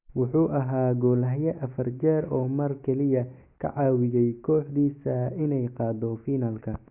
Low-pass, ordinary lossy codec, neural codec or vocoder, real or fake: 3.6 kHz; Opus, 64 kbps; none; real